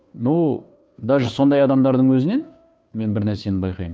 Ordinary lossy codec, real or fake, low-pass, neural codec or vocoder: none; fake; none; codec, 16 kHz, 2 kbps, FunCodec, trained on Chinese and English, 25 frames a second